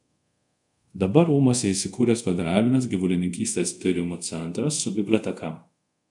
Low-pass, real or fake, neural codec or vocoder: 10.8 kHz; fake; codec, 24 kHz, 0.5 kbps, DualCodec